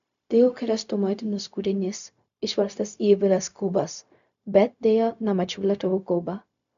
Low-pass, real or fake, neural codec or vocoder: 7.2 kHz; fake; codec, 16 kHz, 0.4 kbps, LongCat-Audio-Codec